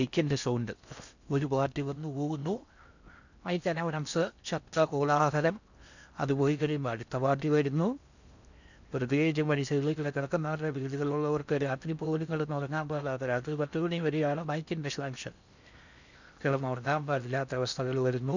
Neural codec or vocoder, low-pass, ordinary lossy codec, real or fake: codec, 16 kHz in and 24 kHz out, 0.6 kbps, FocalCodec, streaming, 4096 codes; 7.2 kHz; none; fake